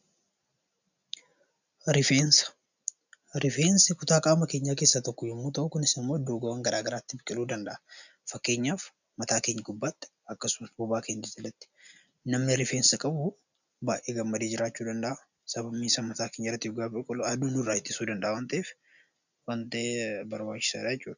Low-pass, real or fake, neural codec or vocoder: 7.2 kHz; real; none